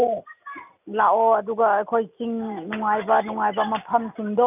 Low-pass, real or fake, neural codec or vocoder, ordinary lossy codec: 3.6 kHz; real; none; none